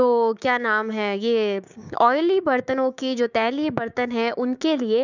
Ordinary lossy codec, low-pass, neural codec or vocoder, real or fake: none; 7.2 kHz; codec, 16 kHz, 6 kbps, DAC; fake